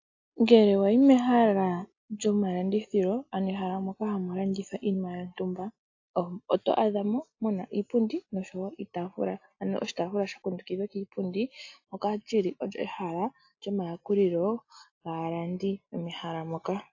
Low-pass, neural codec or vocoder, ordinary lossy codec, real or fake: 7.2 kHz; none; AAC, 48 kbps; real